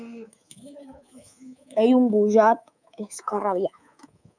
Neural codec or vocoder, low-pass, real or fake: codec, 24 kHz, 3.1 kbps, DualCodec; 9.9 kHz; fake